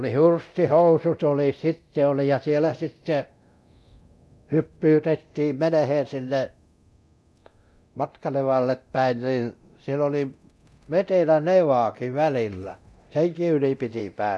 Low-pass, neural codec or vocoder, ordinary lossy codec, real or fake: none; codec, 24 kHz, 0.9 kbps, DualCodec; none; fake